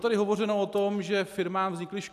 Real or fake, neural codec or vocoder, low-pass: real; none; 14.4 kHz